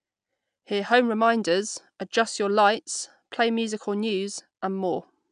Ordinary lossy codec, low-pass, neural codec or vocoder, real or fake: MP3, 96 kbps; 9.9 kHz; none; real